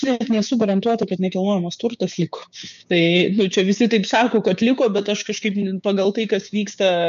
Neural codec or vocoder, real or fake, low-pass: codec, 16 kHz, 6 kbps, DAC; fake; 7.2 kHz